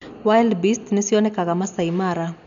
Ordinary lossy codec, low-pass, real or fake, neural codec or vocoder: none; 7.2 kHz; real; none